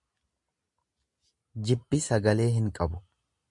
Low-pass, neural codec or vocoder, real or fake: 10.8 kHz; none; real